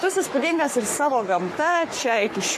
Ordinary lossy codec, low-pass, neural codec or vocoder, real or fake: AAC, 64 kbps; 14.4 kHz; codec, 44.1 kHz, 3.4 kbps, Pupu-Codec; fake